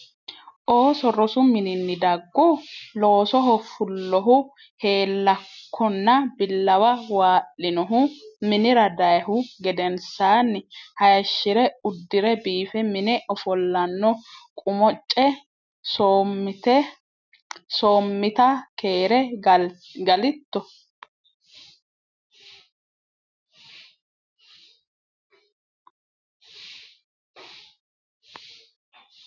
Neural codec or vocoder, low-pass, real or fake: none; 7.2 kHz; real